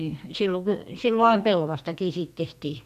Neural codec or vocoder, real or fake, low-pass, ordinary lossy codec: codec, 44.1 kHz, 2.6 kbps, SNAC; fake; 14.4 kHz; none